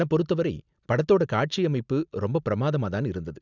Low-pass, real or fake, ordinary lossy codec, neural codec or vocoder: 7.2 kHz; real; none; none